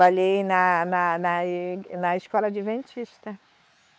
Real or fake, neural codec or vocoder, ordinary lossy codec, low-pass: fake; codec, 16 kHz, 4 kbps, X-Codec, WavLM features, trained on Multilingual LibriSpeech; none; none